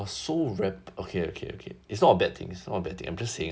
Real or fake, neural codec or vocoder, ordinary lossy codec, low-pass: real; none; none; none